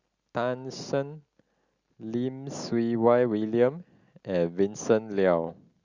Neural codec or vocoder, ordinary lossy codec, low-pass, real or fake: none; Opus, 64 kbps; 7.2 kHz; real